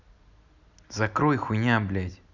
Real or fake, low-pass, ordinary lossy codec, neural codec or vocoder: real; 7.2 kHz; none; none